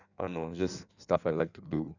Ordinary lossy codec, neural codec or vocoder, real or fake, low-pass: none; codec, 16 kHz in and 24 kHz out, 1.1 kbps, FireRedTTS-2 codec; fake; 7.2 kHz